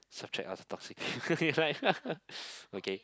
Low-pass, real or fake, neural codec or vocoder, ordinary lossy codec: none; real; none; none